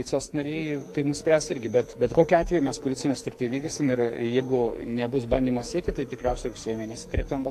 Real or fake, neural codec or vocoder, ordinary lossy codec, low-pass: fake; codec, 44.1 kHz, 2.6 kbps, SNAC; AAC, 64 kbps; 14.4 kHz